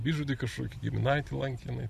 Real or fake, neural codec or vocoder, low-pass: real; none; 14.4 kHz